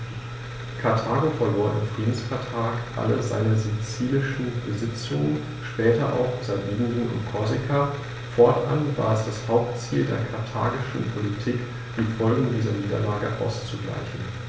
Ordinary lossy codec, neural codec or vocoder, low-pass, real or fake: none; none; none; real